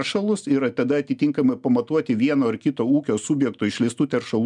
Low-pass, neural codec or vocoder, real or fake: 10.8 kHz; none; real